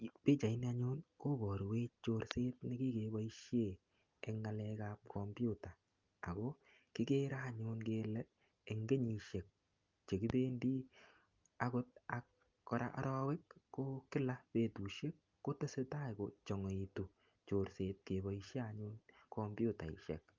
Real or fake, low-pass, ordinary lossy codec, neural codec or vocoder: real; 7.2 kHz; Opus, 24 kbps; none